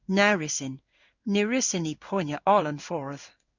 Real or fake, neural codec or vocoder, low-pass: fake; codec, 16 kHz in and 24 kHz out, 1 kbps, XY-Tokenizer; 7.2 kHz